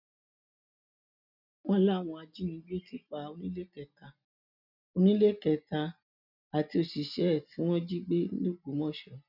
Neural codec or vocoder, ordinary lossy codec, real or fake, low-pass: none; none; real; 5.4 kHz